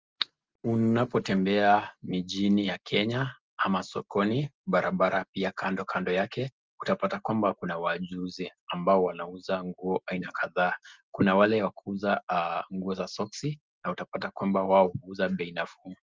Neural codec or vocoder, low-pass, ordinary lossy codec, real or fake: none; 7.2 kHz; Opus, 24 kbps; real